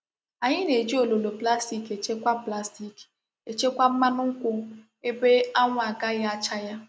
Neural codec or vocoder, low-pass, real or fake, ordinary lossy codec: none; none; real; none